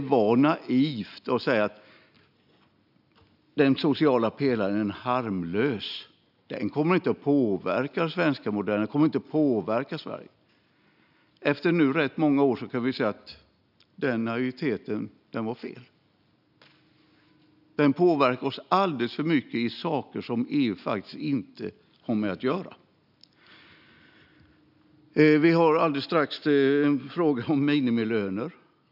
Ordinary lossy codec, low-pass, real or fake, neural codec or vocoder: none; 5.4 kHz; real; none